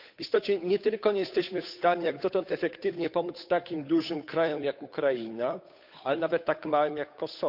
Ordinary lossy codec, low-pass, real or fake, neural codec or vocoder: none; 5.4 kHz; fake; codec, 16 kHz, 8 kbps, FunCodec, trained on Chinese and English, 25 frames a second